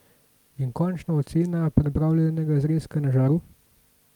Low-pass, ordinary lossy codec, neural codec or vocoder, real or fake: 19.8 kHz; Opus, 32 kbps; none; real